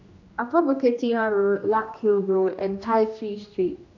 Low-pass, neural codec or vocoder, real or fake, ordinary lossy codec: 7.2 kHz; codec, 16 kHz, 1 kbps, X-Codec, HuBERT features, trained on general audio; fake; AAC, 48 kbps